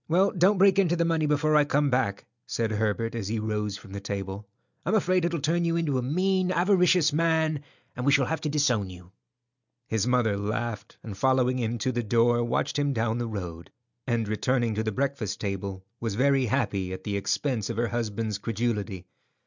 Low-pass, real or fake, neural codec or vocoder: 7.2 kHz; real; none